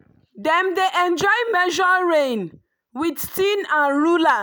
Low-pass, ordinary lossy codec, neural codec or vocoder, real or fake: none; none; none; real